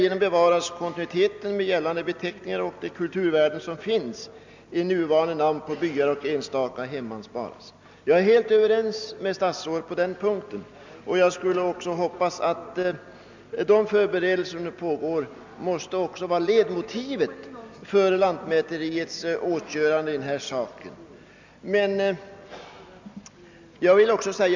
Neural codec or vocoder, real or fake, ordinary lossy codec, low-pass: none; real; MP3, 64 kbps; 7.2 kHz